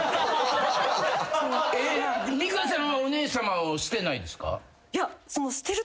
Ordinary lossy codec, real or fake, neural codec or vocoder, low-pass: none; real; none; none